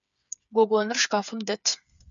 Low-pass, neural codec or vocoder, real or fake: 7.2 kHz; codec, 16 kHz, 8 kbps, FreqCodec, smaller model; fake